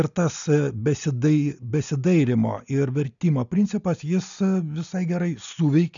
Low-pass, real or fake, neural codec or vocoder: 7.2 kHz; real; none